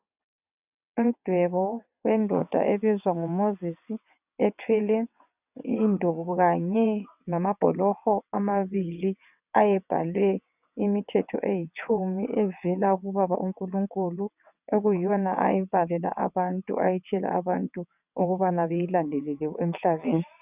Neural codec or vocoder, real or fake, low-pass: vocoder, 22.05 kHz, 80 mel bands, WaveNeXt; fake; 3.6 kHz